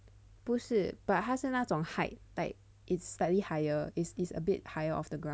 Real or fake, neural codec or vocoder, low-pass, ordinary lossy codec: real; none; none; none